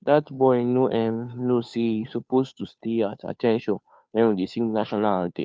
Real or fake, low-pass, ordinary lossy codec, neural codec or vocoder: fake; none; none; codec, 16 kHz, 2 kbps, FunCodec, trained on Chinese and English, 25 frames a second